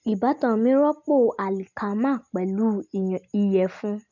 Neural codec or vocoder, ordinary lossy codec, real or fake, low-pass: none; none; real; 7.2 kHz